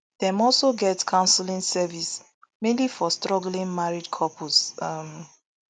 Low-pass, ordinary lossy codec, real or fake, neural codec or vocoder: none; none; real; none